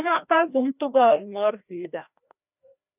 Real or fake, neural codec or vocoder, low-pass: fake; codec, 16 kHz, 1 kbps, FreqCodec, larger model; 3.6 kHz